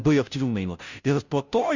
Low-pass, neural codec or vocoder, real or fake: 7.2 kHz; codec, 16 kHz, 0.5 kbps, FunCodec, trained on Chinese and English, 25 frames a second; fake